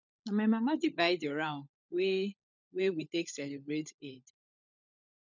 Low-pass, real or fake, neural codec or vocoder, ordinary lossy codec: 7.2 kHz; fake; codec, 16 kHz, 16 kbps, FunCodec, trained on LibriTTS, 50 frames a second; none